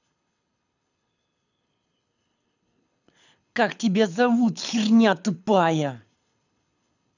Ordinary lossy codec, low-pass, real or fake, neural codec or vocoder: none; 7.2 kHz; fake; codec, 24 kHz, 6 kbps, HILCodec